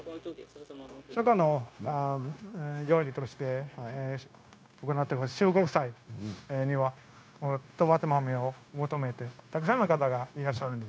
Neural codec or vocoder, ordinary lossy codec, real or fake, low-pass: codec, 16 kHz, 0.9 kbps, LongCat-Audio-Codec; none; fake; none